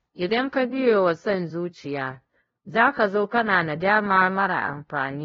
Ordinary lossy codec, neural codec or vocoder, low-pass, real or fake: AAC, 24 kbps; codec, 16 kHz, 0.5 kbps, FunCodec, trained on LibriTTS, 25 frames a second; 7.2 kHz; fake